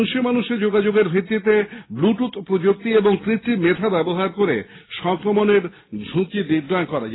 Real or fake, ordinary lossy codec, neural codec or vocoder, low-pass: real; AAC, 16 kbps; none; 7.2 kHz